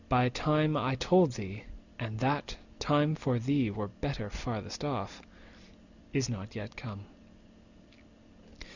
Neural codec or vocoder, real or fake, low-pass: none; real; 7.2 kHz